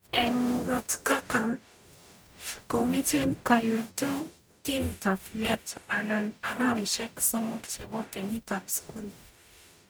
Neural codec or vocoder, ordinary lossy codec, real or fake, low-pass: codec, 44.1 kHz, 0.9 kbps, DAC; none; fake; none